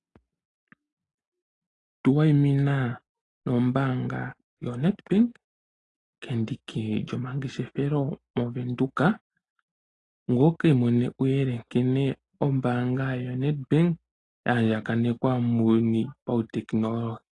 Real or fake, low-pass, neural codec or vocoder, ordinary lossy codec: real; 10.8 kHz; none; AAC, 32 kbps